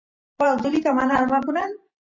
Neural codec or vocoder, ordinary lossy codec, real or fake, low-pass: vocoder, 44.1 kHz, 128 mel bands every 512 samples, BigVGAN v2; MP3, 32 kbps; fake; 7.2 kHz